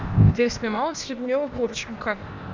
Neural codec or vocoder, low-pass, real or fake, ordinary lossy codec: codec, 16 kHz, 0.8 kbps, ZipCodec; 7.2 kHz; fake; MP3, 64 kbps